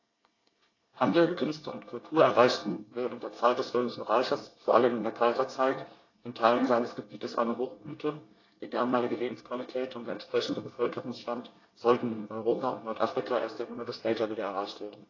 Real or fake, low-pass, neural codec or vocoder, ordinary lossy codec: fake; 7.2 kHz; codec, 24 kHz, 1 kbps, SNAC; AAC, 32 kbps